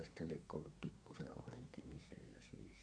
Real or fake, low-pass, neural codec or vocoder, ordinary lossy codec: fake; 9.9 kHz; codec, 24 kHz, 1 kbps, SNAC; none